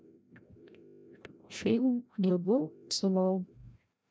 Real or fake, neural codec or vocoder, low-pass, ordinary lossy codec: fake; codec, 16 kHz, 0.5 kbps, FreqCodec, larger model; none; none